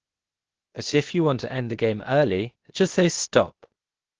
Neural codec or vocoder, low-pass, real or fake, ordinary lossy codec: codec, 16 kHz, 0.8 kbps, ZipCodec; 7.2 kHz; fake; Opus, 16 kbps